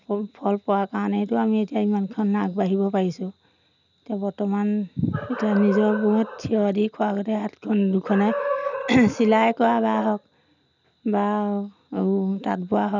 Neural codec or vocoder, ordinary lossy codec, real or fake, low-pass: none; none; real; 7.2 kHz